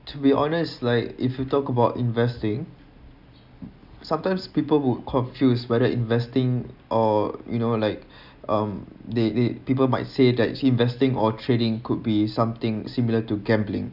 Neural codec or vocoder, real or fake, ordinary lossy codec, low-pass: none; real; none; 5.4 kHz